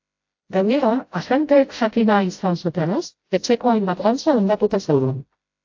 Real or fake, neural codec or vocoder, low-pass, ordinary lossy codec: fake; codec, 16 kHz, 0.5 kbps, FreqCodec, smaller model; 7.2 kHz; AAC, 48 kbps